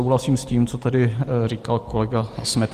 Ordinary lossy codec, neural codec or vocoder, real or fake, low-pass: Opus, 24 kbps; vocoder, 44.1 kHz, 128 mel bands every 512 samples, BigVGAN v2; fake; 14.4 kHz